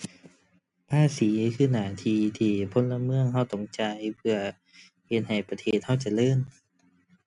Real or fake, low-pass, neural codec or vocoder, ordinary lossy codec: real; 10.8 kHz; none; MP3, 96 kbps